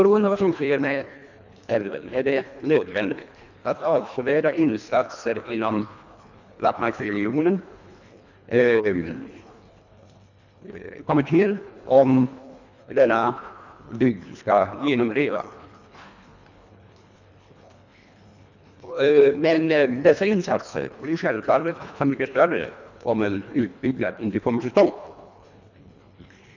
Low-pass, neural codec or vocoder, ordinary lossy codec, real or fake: 7.2 kHz; codec, 24 kHz, 1.5 kbps, HILCodec; none; fake